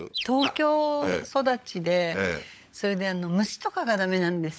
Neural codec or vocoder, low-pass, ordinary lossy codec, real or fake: codec, 16 kHz, 16 kbps, FunCodec, trained on LibriTTS, 50 frames a second; none; none; fake